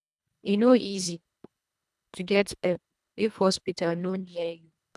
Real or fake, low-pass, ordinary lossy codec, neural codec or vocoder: fake; none; none; codec, 24 kHz, 1.5 kbps, HILCodec